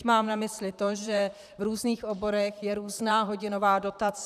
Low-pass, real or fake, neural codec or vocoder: 14.4 kHz; fake; vocoder, 44.1 kHz, 128 mel bands, Pupu-Vocoder